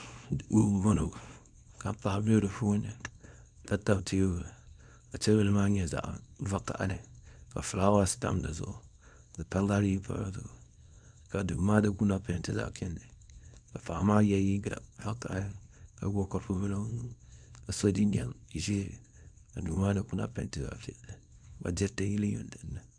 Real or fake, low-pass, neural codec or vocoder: fake; 9.9 kHz; codec, 24 kHz, 0.9 kbps, WavTokenizer, small release